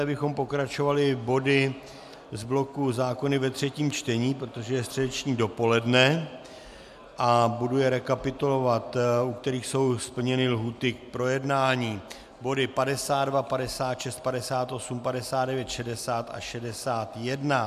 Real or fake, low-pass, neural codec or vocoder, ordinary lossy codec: real; 14.4 kHz; none; MP3, 96 kbps